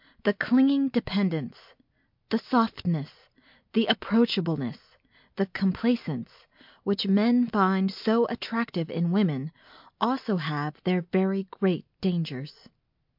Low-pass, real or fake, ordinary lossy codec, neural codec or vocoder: 5.4 kHz; real; MP3, 48 kbps; none